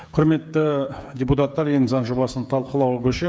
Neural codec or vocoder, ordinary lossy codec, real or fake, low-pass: codec, 16 kHz, 8 kbps, FreqCodec, smaller model; none; fake; none